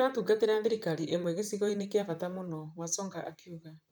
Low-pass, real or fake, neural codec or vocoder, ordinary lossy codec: none; fake; vocoder, 44.1 kHz, 128 mel bands, Pupu-Vocoder; none